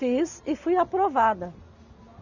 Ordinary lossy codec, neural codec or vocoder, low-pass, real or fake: none; none; 7.2 kHz; real